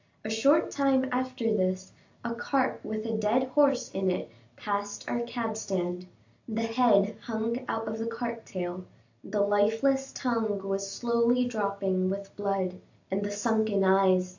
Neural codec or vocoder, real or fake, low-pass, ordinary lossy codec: none; real; 7.2 kHz; AAC, 48 kbps